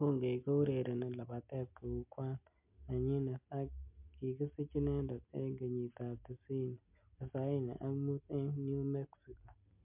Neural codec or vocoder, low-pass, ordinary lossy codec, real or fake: none; 3.6 kHz; MP3, 32 kbps; real